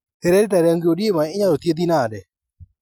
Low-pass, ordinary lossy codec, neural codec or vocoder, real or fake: 19.8 kHz; none; none; real